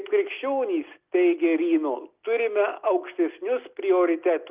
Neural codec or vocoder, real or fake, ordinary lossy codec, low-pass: none; real; Opus, 24 kbps; 3.6 kHz